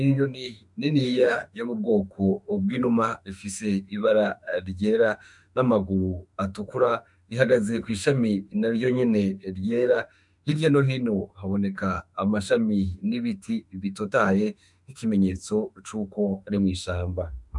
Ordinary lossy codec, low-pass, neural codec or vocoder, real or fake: MP3, 96 kbps; 10.8 kHz; autoencoder, 48 kHz, 32 numbers a frame, DAC-VAE, trained on Japanese speech; fake